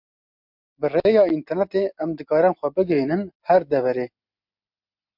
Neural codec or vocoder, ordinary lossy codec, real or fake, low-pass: none; MP3, 48 kbps; real; 5.4 kHz